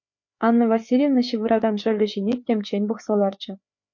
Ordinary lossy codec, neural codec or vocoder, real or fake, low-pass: MP3, 48 kbps; codec, 16 kHz, 4 kbps, FreqCodec, larger model; fake; 7.2 kHz